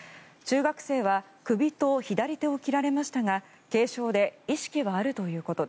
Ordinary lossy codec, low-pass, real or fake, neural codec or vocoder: none; none; real; none